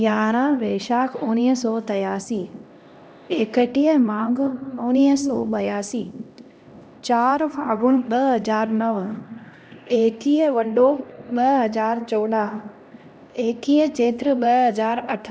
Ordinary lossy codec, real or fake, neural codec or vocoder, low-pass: none; fake; codec, 16 kHz, 1 kbps, X-Codec, HuBERT features, trained on LibriSpeech; none